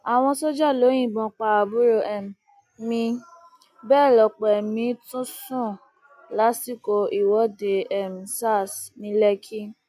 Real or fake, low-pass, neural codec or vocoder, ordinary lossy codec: real; 14.4 kHz; none; none